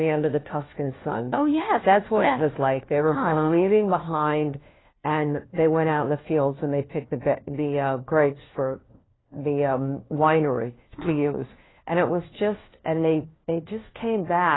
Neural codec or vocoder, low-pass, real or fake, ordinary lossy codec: codec, 16 kHz, 1 kbps, FunCodec, trained on LibriTTS, 50 frames a second; 7.2 kHz; fake; AAC, 16 kbps